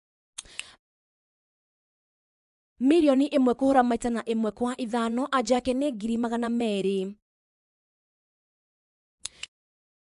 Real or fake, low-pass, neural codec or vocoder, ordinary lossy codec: fake; 10.8 kHz; vocoder, 24 kHz, 100 mel bands, Vocos; AAC, 96 kbps